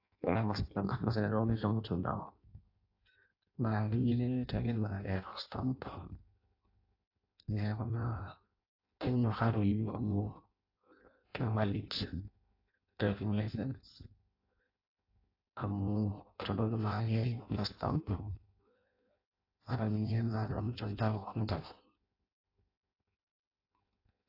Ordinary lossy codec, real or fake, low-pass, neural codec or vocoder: AAC, 32 kbps; fake; 5.4 kHz; codec, 16 kHz in and 24 kHz out, 0.6 kbps, FireRedTTS-2 codec